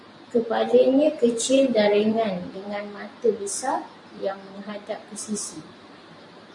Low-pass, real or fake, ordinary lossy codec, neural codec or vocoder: 10.8 kHz; fake; MP3, 48 kbps; vocoder, 44.1 kHz, 128 mel bands every 512 samples, BigVGAN v2